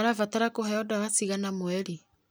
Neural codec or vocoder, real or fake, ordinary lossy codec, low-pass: none; real; none; none